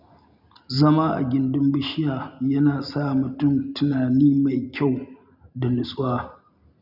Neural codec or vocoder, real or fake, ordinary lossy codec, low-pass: none; real; none; 5.4 kHz